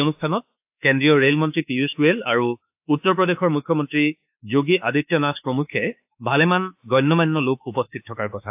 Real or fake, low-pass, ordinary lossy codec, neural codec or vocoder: fake; 3.6 kHz; AAC, 32 kbps; autoencoder, 48 kHz, 32 numbers a frame, DAC-VAE, trained on Japanese speech